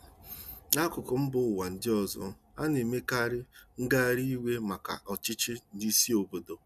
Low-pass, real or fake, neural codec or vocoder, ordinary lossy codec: 14.4 kHz; real; none; none